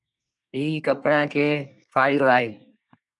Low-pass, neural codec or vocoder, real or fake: 10.8 kHz; codec, 24 kHz, 1 kbps, SNAC; fake